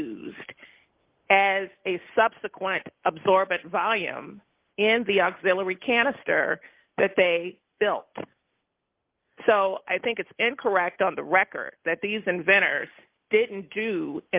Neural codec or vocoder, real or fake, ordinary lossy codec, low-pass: none; real; Opus, 24 kbps; 3.6 kHz